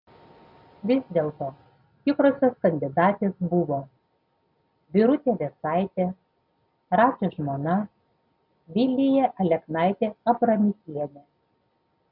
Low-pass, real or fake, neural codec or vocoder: 5.4 kHz; real; none